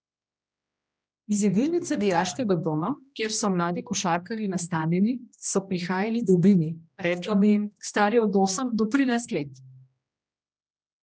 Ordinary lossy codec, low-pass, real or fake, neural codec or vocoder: none; none; fake; codec, 16 kHz, 1 kbps, X-Codec, HuBERT features, trained on general audio